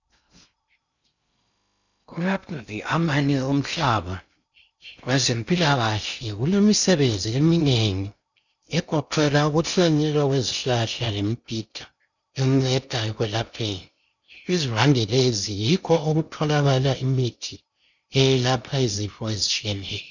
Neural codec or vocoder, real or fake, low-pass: codec, 16 kHz in and 24 kHz out, 0.6 kbps, FocalCodec, streaming, 2048 codes; fake; 7.2 kHz